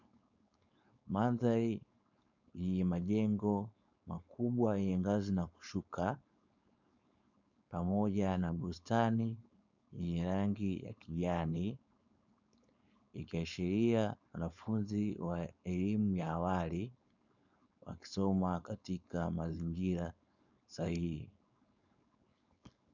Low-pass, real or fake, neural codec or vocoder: 7.2 kHz; fake; codec, 16 kHz, 4.8 kbps, FACodec